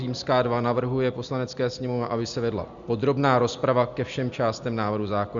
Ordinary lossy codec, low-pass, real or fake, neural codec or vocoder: Opus, 64 kbps; 7.2 kHz; real; none